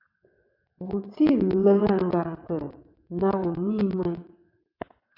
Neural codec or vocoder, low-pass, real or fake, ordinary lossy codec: vocoder, 22.05 kHz, 80 mel bands, WaveNeXt; 5.4 kHz; fake; MP3, 48 kbps